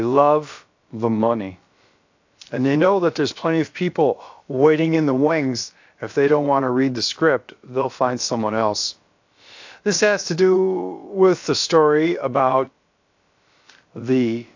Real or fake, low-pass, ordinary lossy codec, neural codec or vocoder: fake; 7.2 kHz; AAC, 48 kbps; codec, 16 kHz, about 1 kbps, DyCAST, with the encoder's durations